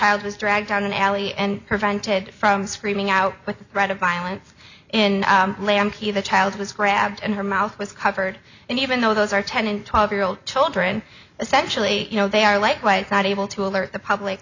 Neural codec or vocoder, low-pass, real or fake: none; 7.2 kHz; real